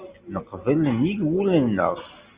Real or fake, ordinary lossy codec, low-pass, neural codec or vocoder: real; Opus, 64 kbps; 3.6 kHz; none